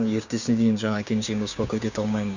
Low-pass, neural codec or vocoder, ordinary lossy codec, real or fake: 7.2 kHz; codec, 16 kHz, 6 kbps, DAC; none; fake